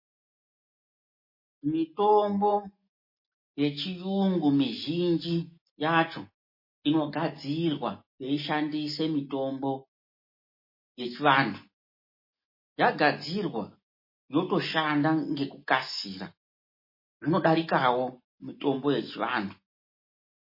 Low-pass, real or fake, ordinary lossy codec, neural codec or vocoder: 5.4 kHz; real; MP3, 24 kbps; none